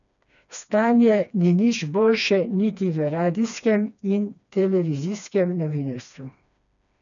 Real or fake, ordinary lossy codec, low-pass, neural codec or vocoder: fake; none; 7.2 kHz; codec, 16 kHz, 2 kbps, FreqCodec, smaller model